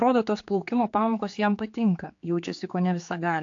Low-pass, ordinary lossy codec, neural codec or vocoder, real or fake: 7.2 kHz; AAC, 48 kbps; codec, 16 kHz, 4 kbps, X-Codec, HuBERT features, trained on general audio; fake